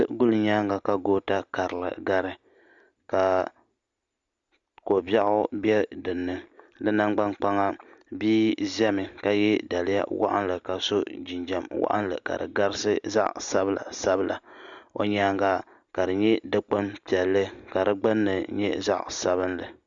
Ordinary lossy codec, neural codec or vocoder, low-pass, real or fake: Opus, 64 kbps; none; 7.2 kHz; real